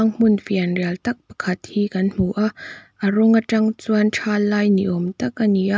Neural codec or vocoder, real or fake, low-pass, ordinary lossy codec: none; real; none; none